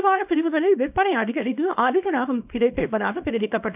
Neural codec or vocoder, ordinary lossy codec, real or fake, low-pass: codec, 24 kHz, 0.9 kbps, WavTokenizer, small release; none; fake; 3.6 kHz